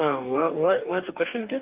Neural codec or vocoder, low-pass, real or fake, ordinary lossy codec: codec, 44.1 kHz, 2.6 kbps, DAC; 3.6 kHz; fake; Opus, 24 kbps